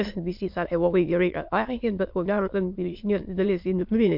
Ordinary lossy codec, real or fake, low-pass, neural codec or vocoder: MP3, 48 kbps; fake; 5.4 kHz; autoencoder, 22.05 kHz, a latent of 192 numbers a frame, VITS, trained on many speakers